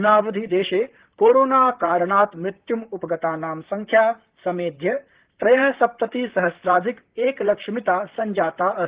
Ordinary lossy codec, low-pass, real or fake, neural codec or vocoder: Opus, 16 kbps; 3.6 kHz; fake; vocoder, 44.1 kHz, 128 mel bands, Pupu-Vocoder